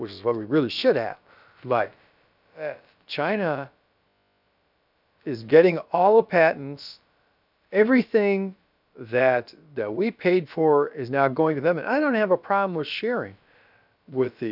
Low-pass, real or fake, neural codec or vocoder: 5.4 kHz; fake; codec, 16 kHz, about 1 kbps, DyCAST, with the encoder's durations